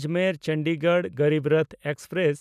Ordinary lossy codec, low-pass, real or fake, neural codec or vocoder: none; 14.4 kHz; real; none